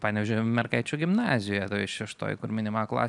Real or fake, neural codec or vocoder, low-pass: real; none; 10.8 kHz